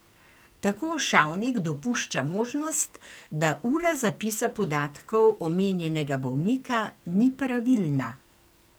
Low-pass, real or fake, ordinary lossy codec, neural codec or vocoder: none; fake; none; codec, 44.1 kHz, 2.6 kbps, SNAC